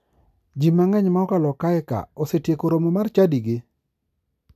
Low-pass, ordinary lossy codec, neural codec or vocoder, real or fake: 14.4 kHz; none; none; real